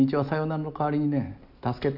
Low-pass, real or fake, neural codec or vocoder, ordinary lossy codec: 5.4 kHz; real; none; none